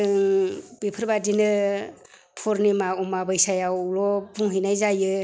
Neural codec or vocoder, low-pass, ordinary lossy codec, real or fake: none; none; none; real